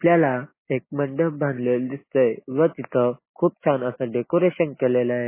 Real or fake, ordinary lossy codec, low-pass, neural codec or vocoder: real; MP3, 16 kbps; 3.6 kHz; none